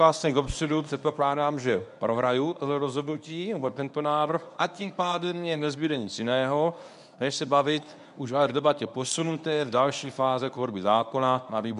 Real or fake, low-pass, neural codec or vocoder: fake; 10.8 kHz; codec, 24 kHz, 0.9 kbps, WavTokenizer, medium speech release version 1